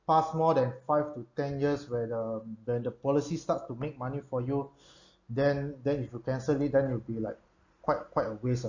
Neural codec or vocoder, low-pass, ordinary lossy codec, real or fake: none; 7.2 kHz; none; real